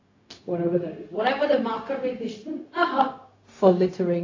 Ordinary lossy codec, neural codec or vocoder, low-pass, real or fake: AAC, 32 kbps; codec, 16 kHz, 0.4 kbps, LongCat-Audio-Codec; 7.2 kHz; fake